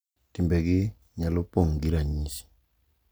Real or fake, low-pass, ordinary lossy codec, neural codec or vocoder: real; none; none; none